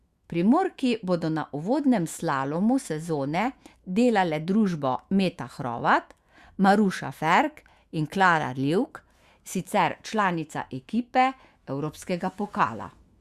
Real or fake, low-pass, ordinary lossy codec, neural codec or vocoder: fake; 14.4 kHz; Opus, 64 kbps; autoencoder, 48 kHz, 128 numbers a frame, DAC-VAE, trained on Japanese speech